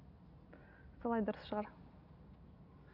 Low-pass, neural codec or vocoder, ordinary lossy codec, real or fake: 5.4 kHz; none; none; real